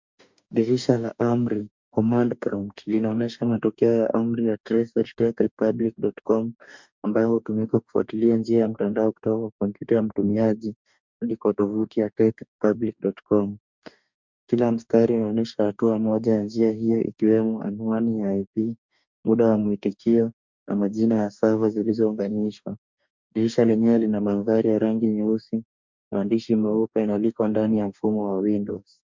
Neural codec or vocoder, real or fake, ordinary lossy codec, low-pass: codec, 44.1 kHz, 2.6 kbps, DAC; fake; MP3, 64 kbps; 7.2 kHz